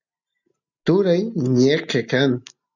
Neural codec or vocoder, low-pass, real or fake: none; 7.2 kHz; real